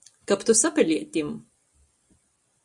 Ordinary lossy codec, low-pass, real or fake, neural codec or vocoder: Opus, 64 kbps; 10.8 kHz; real; none